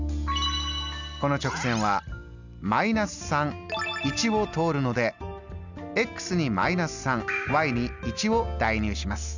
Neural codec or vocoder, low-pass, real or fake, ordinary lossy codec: none; 7.2 kHz; real; none